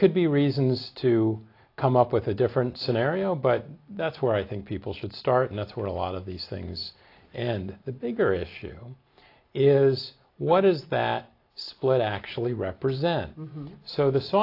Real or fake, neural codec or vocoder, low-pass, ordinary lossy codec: real; none; 5.4 kHz; AAC, 32 kbps